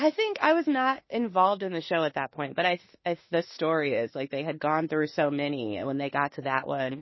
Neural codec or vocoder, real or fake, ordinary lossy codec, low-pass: autoencoder, 48 kHz, 32 numbers a frame, DAC-VAE, trained on Japanese speech; fake; MP3, 24 kbps; 7.2 kHz